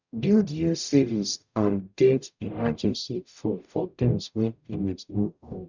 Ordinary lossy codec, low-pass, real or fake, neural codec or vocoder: none; 7.2 kHz; fake; codec, 44.1 kHz, 0.9 kbps, DAC